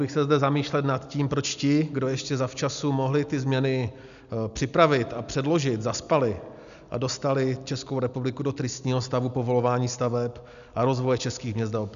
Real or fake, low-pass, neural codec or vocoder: real; 7.2 kHz; none